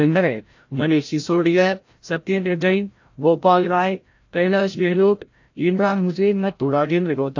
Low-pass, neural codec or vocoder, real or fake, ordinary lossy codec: 7.2 kHz; codec, 16 kHz, 0.5 kbps, FreqCodec, larger model; fake; AAC, 48 kbps